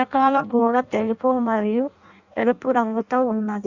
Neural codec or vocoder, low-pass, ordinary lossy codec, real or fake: codec, 16 kHz in and 24 kHz out, 0.6 kbps, FireRedTTS-2 codec; 7.2 kHz; Opus, 64 kbps; fake